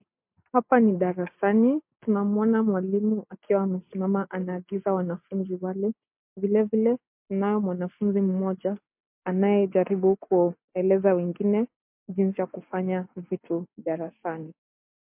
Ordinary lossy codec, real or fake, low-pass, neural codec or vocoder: MP3, 32 kbps; real; 3.6 kHz; none